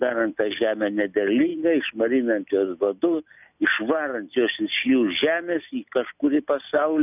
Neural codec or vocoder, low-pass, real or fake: none; 3.6 kHz; real